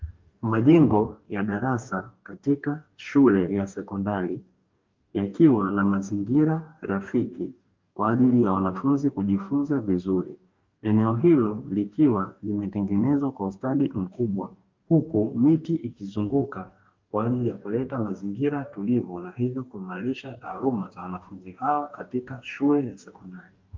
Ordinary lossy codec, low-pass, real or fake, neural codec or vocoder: Opus, 24 kbps; 7.2 kHz; fake; codec, 44.1 kHz, 2.6 kbps, DAC